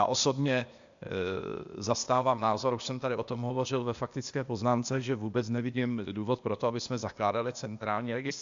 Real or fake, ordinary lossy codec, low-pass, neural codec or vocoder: fake; MP3, 64 kbps; 7.2 kHz; codec, 16 kHz, 0.8 kbps, ZipCodec